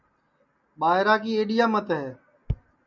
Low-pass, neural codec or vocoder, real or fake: 7.2 kHz; none; real